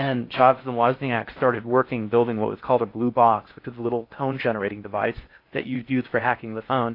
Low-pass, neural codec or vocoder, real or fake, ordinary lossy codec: 5.4 kHz; codec, 16 kHz in and 24 kHz out, 0.6 kbps, FocalCodec, streaming, 4096 codes; fake; AAC, 32 kbps